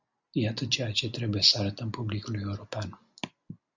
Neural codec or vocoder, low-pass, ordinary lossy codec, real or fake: none; 7.2 kHz; Opus, 64 kbps; real